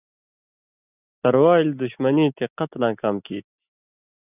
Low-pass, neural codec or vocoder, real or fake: 3.6 kHz; none; real